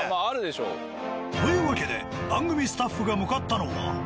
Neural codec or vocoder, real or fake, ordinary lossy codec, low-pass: none; real; none; none